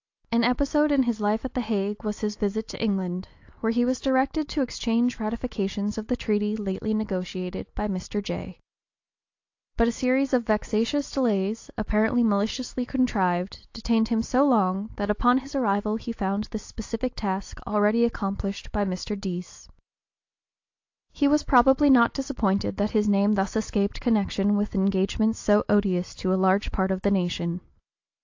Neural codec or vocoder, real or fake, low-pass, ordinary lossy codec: none; real; 7.2 kHz; AAC, 48 kbps